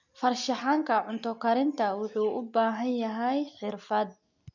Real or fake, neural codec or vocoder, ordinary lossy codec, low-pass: real; none; none; 7.2 kHz